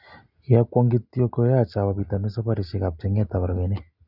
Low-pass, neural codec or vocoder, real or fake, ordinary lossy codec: 5.4 kHz; none; real; Opus, 64 kbps